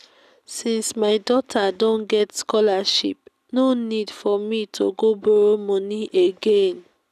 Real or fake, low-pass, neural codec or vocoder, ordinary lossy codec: real; 14.4 kHz; none; none